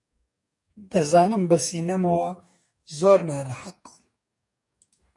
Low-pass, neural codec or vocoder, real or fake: 10.8 kHz; codec, 44.1 kHz, 2.6 kbps, DAC; fake